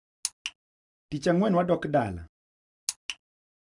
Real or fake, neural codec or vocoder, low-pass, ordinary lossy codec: real; none; 10.8 kHz; none